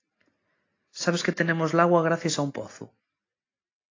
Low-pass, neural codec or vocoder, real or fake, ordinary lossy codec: 7.2 kHz; none; real; AAC, 32 kbps